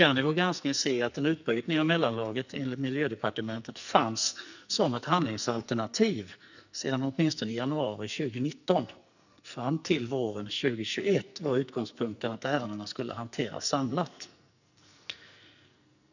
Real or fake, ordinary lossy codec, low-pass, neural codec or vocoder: fake; none; 7.2 kHz; codec, 44.1 kHz, 2.6 kbps, SNAC